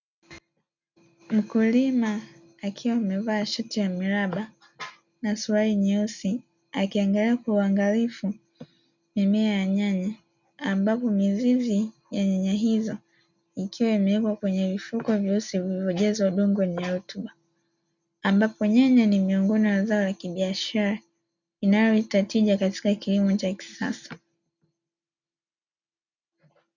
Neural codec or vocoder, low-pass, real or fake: none; 7.2 kHz; real